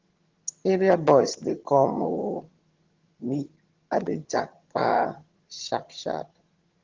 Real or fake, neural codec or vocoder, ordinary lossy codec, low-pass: fake; vocoder, 22.05 kHz, 80 mel bands, HiFi-GAN; Opus, 16 kbps; 7.2 kHz